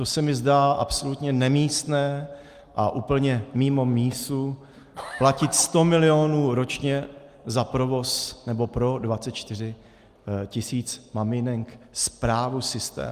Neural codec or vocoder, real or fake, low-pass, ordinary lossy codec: none; real; 14.4 kHz; Opus, 32 kbps